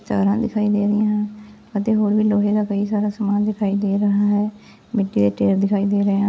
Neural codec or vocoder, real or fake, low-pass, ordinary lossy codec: none; real; none; none